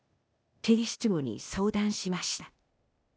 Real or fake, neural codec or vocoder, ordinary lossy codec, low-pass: fake; codec, 16 kHz, 0.8 kbps, ZipCodec; none; none